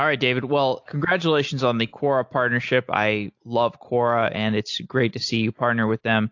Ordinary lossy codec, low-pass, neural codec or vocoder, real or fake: AAC, 48 kbps; 7.2 kHz; none; real